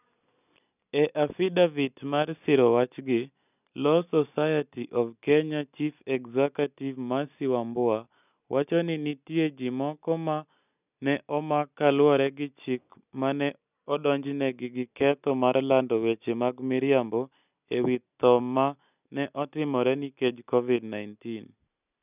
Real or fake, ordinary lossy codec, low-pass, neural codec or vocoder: real; none; 3.6 kHz; none